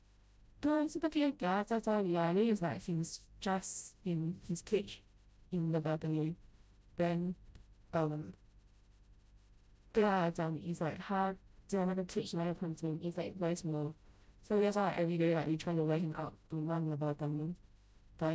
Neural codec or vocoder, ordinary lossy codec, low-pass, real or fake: codec, 16 kHz, 0.5 kbps, FreqCodec, smaller model; none; none; fake